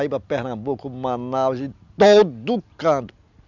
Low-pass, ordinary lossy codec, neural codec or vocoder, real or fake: 7.2 kHz; none; none; real